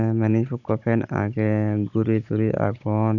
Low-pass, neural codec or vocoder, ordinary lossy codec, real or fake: 7.2 kHz; codec, 16 kHz, 16 kbps, FunCodec, trained on Chinese and English, 50 frames a second; none; fake